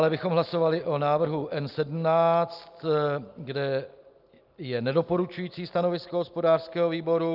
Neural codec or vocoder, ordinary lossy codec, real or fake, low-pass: none; Opus, 24 kbps; real; 5.4 kHz